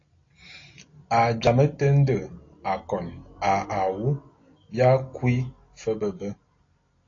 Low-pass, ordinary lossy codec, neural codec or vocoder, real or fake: 7.2 kHz; AAC, 32 kbps; none; real